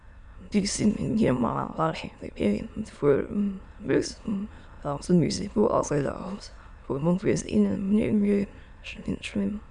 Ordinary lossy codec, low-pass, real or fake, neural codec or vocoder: none; 9.9 kHz; fake; autoencoder, 22.05 kHz, a latent of 192 numbers a frame, VITS, trained on many speakers